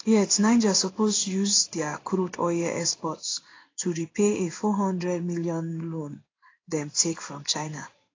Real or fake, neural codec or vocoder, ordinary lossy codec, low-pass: fake; codec, 16 kHz in and 24 kHz out, 1 kbps, XY-Tokenizer; AAC, 32 kbps; 7.2 kHz